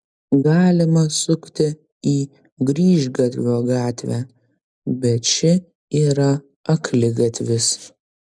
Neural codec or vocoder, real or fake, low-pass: none; real; 9.9 kHz